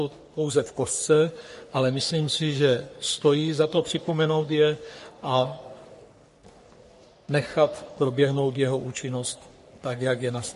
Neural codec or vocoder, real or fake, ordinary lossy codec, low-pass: codec, 44.1 kHz, 3.4 kbps, Pupu-Codec; fake; MP3, 48 kbps; 14.4 kHz